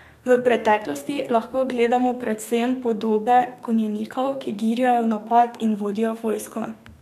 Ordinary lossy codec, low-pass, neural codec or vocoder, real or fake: none; 14.4 kHz; codec, 32 kHz, 1.9 kbps, SNAC; fake